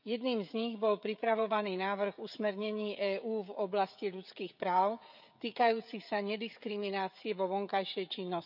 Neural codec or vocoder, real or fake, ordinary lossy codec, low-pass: codec, 16 kHz, 16 kbps, FreqCodec, smaller model; fake; none; 5.4 kHz